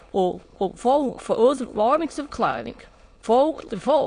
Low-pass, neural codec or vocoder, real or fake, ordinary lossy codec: 9.9 kHz; autoencoder, 22.05 kHz, a latent of 192 numbers a frame, VITS, trained on many speakers; fake; AAC, 48 kbps